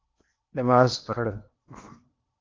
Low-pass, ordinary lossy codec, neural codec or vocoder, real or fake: 7.2 kHz; Opus, 24 kbps; codec, 16 kHz in and 24 kHz out, 0.8 kbps, FocalCodec, streaming, 65536 codes; fake